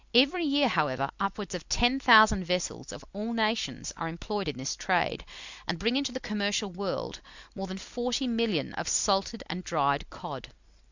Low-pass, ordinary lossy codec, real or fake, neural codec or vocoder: 7.2 kHz; Opus, 64 kbps; real; none